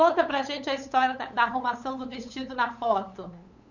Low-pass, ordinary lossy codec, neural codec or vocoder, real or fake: 7.2 kHz; none; codec, 16 kHz, 8 kbps, FunCodec, trained on LibriTTS, 25 frames a second; fake